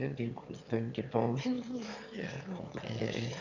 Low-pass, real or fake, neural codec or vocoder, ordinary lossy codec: 7.2 kHz; fake; autoencoder, 22.05 kHz, a latent of 192 numbers a frame, VITS, trained on one speaker; none